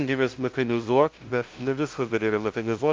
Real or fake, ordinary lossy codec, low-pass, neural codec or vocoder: fake; Opus, 24 kbps; 7.2 kHz; codec, 16 kHz, 0.5 kbps, FunCodec, trained on LibriTTS, 25 frames a second